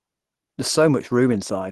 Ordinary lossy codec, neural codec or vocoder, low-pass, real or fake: Opus, 16 kbps; none; 14.4 kHz; real